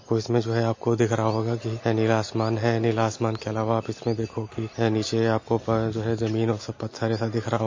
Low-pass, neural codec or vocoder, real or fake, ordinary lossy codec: 7.2 kHz; none; real; MP3, 32 kbps